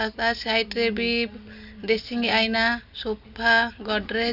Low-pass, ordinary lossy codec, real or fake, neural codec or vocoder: 5.4 kHz; none; real; none